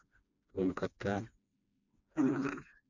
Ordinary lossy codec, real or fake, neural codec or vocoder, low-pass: none; fake; codec, 16 kHz, 2 kbps, FreqCodec, smaller model; 7.2 kHz